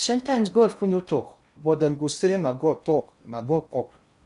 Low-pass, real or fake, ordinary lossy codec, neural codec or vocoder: 10.8 kHz; fake; none; codec, 16 kHz in and 24 kHz out, 0.6 kbps, FocalCodec, streaming, 4096 codes